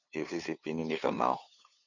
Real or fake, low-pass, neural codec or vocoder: fake; 7.2 kHz; codec, 16 kHz, 2 kbps, FunCodec, trained on LibriTTS, 25 frames a second